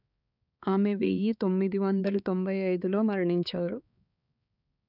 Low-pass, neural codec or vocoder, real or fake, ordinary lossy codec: 5.4 kHz; codec, 16 kHz, 4 kbps, X-Codec, HuBERT features, trained on balanced general audio; fake; none